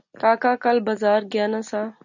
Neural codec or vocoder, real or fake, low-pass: none; real; 7.2 kHz